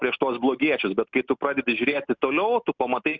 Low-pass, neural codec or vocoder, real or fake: 7.2 kHz; none; real